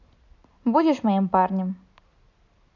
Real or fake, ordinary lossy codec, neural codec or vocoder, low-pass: real; none; none; 7.2 kHz